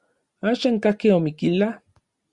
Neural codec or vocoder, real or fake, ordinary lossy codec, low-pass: none; real; Opus, 64 kbps; 10.8 kHz